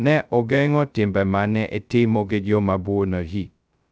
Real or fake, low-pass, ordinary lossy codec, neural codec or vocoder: fake; none; none; codec, 16 kHz, 0.2 kbps, FocalCodec